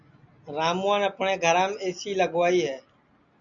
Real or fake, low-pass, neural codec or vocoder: real; 7.2 kHz; none